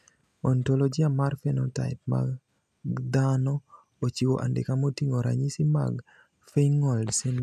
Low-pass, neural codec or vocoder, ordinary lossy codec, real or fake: 14.4 kHz; none; none; real